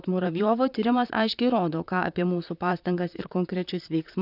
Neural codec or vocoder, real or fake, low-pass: vocoder, 44.1 kHz, 128 mel bands, Pupu-Vocoder; fake; 5.4 kHz